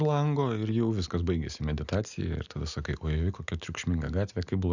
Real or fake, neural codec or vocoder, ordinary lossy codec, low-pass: real; none; Opus, 64 kbps; 7.2 kHz